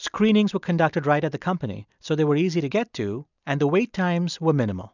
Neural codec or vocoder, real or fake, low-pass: none; real; 7.2 kHz